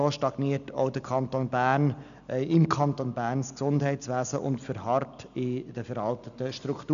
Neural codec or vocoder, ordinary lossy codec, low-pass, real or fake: none; none; 7.2 kHz; real